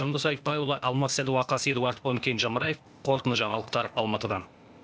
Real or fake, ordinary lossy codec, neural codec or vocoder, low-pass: fake; none; codec, 16 kHz, 0.8 kbps, ZipCodec; none